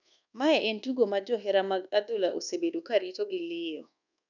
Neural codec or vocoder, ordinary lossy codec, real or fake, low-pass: codec, 24 kHz, 1.2 kbps, DualCodec; none; fake; 7.2 kHz